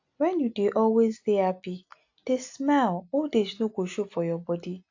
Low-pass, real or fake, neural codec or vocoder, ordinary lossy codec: 7.2 kHz; real; none; AAC, 48 kbps